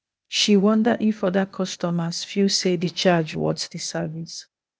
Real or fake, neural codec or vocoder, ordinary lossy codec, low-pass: fake; codec, 16 kHz, 0.8 kbps, ZipCodec; none; none